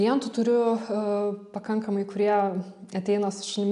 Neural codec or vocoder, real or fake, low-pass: none; real; 10.8 kHz